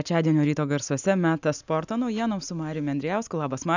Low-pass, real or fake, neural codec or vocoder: 7.2 kHz; real; none